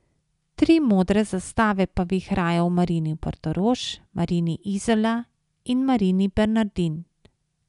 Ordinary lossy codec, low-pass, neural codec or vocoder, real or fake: none; 10.8 kHz; none; real